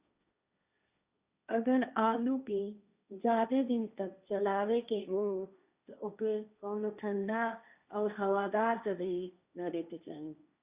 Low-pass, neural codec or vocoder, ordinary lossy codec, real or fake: 3.6 kHz; codec, 16 kHz, 1.1 kbps, Voila-Tokenizer; Opus, 64 kbps; fake